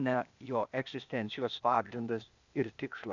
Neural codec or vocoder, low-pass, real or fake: codec, 16 kHz, 0.8 kbps, ZipCodec; 7.2 kHz; fake